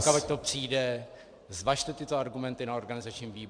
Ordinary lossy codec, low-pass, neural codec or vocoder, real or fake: MP3, 96 kbps; 9.9 kHz; vocoder, 44.1 kHz, 128 mel bands every 512 samples, BigVGAN v2; fake